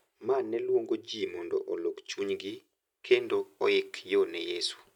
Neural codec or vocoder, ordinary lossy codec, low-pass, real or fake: none; none; 19.8 kHz; real